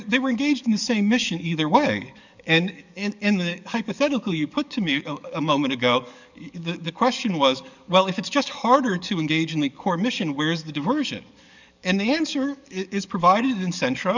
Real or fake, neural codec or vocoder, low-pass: fake; codec, 44.1 kHz, 7.8 kbps, DAC; 7.2 kHz